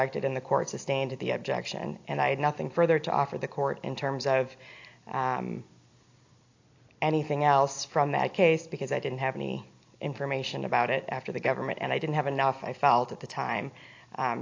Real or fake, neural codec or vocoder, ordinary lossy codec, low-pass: real; none; AAC, 48 kbps; 7.2 kHz